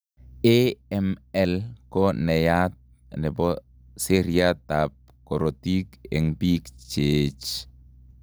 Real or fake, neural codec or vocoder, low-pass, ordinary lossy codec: real; none; none; none